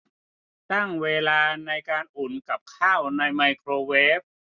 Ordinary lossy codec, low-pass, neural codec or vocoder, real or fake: none; 7.2 kHz; none; real